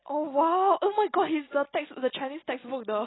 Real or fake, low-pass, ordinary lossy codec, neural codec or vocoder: real; 7.2 kHz; AAC, 16 kbps; none